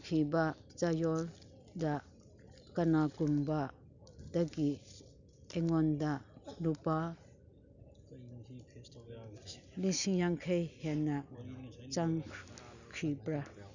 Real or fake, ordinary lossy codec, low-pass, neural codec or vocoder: real; none; 7.2 kHz; none